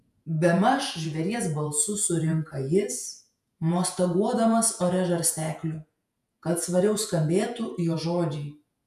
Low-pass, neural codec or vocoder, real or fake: 14.4 kHz; vocoder, 48 kHz, 128 mel bands, Vocos; fake